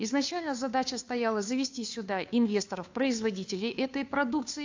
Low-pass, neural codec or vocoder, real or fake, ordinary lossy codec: 7.2 kHz; codec, 16 kHz, 2 kbps, FunCodec, trained on Chinese and English, 25 frames a second; fake; AAC, 48 kbps